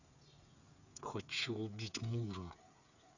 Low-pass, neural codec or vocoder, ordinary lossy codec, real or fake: 7.2 kHz; codec, 16 kHz, 8 kbps, FreqCodec, smaller model; none; fake